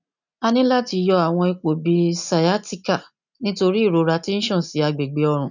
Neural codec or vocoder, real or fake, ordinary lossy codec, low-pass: none; real; none; 7.2 kHz